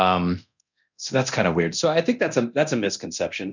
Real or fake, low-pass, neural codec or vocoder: fake; 7.2 kHz; codec, 24 kHz, 0.9 kbps, DualCodec